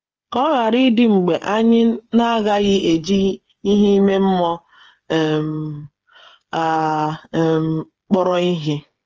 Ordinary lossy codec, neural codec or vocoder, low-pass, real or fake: Opus, 24 kbps; codec, 16 kHz, 8 kbps, FreqCodec, smaller model; 7.2 kHz; fake